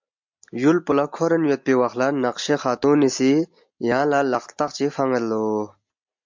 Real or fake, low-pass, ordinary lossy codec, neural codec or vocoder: real; 7.2 kHz; MP3, 64 kbps; none